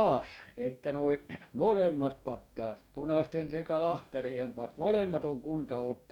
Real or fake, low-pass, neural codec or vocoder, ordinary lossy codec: fake; 19.8 kHz; codec, 44.1 kHz, 2.6 kbps, DAC; none